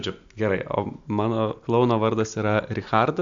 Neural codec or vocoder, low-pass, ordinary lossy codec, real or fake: none; 7.2 kHz; AAC, 64 kbps; real